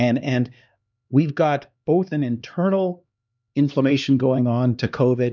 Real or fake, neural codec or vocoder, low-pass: fake; codec, 16 kHz, 2 kbps, X-Codec, HuBERT features, trained on LibriSpeech; 7.2 kHz